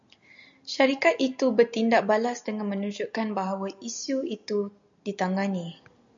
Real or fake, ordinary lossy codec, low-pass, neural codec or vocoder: real; MP3, 64 kbps; 7.2 kHz; none